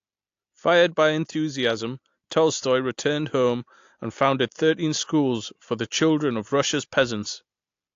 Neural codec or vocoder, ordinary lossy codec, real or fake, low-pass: none; AAC, 48 kbps; real; 7.2 kHz